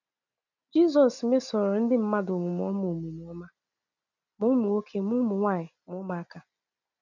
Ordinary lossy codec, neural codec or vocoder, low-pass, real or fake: none; none; 7.2 kHz; real